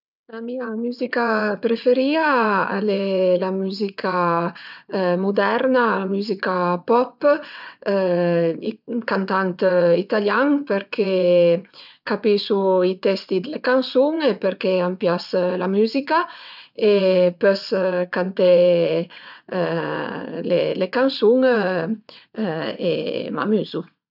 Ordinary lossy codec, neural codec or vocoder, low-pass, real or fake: none; vocoder, 22.05 kHz, 80 mel bands, WaveNeXt; 5.4 kHz; fake